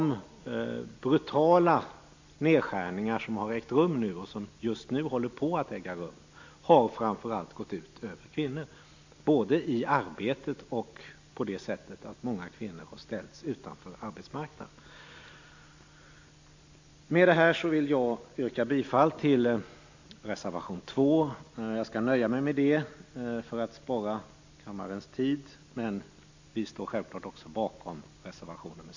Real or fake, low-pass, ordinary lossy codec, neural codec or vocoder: real; 7.2 kHz; AAC, 48 kbps; none